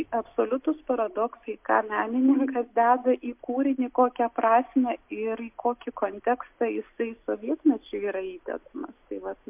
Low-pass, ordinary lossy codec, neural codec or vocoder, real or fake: 3.6 kHz; MP3, 32 kbps; none; real